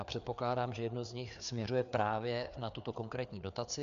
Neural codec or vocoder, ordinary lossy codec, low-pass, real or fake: codec, 16 kHz, 4 kbps, FreqCodec, larger model; AAC, 96 kbps; 7.2 kHz; fake